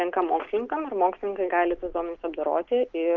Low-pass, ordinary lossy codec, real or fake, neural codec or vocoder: 7.2 kHz; Opus, 32 kbps; real; none